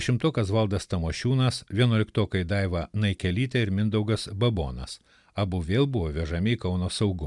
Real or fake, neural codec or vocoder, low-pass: real; none; 10.8 kHz